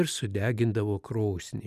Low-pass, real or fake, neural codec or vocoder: 14.4 kHz; real; none